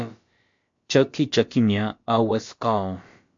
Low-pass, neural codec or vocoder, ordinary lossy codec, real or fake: 7.2 kHz; codec, 16 kHz, about 1 kbps, DyCAST, with the encoder's durations; MP3, 48 kbps; fake